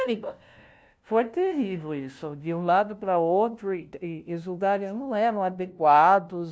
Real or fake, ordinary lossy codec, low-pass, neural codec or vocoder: fake; none; none; codec, 16 kHz, 0.5 kbps, FunCodec, trained on LibriTTS, 25 frames a second